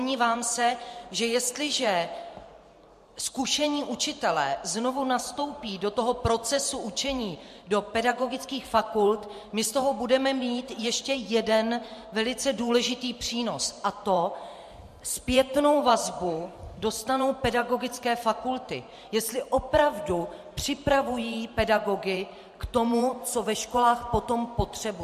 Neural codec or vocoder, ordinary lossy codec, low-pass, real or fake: vocoder, 44.1 kHz, 128 mel bands every 512 samples, BigVGAN v2; MP3, 64 kbps; 14.4 kHz; fake